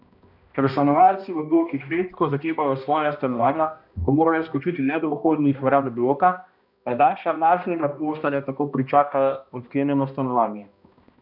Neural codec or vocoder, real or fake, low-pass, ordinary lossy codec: codec, 16 kHz, 1 kbps, X-Codec, HuBERT features, trained on balanced general audio; fake; 5.4 kHz; none